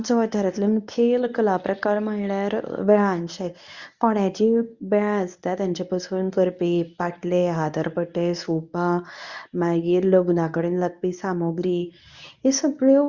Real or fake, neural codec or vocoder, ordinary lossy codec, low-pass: fake; codec, 24 kHz, 0.9 kbps, WavTokenizer, medium speech release version 1; Opus, 64 kbps; 7.2 kHz